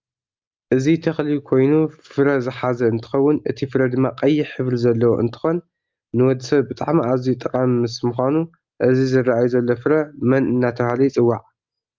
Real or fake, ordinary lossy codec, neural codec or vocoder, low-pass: real; Opus, 32 kbps; none; 7.2 kHz